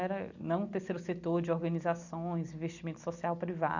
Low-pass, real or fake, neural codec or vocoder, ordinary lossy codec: 7.2 kHz; real; none; none